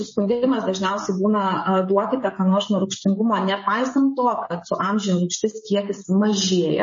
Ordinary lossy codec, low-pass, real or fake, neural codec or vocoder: MP3, 32 kbps; 7.2 kHz; fake; codec, 16 kHz, 16 kbps, FreqCodec, smaller model